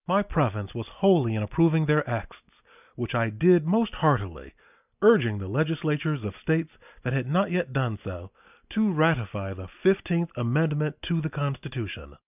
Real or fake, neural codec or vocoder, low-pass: real; none; 3.6 kHz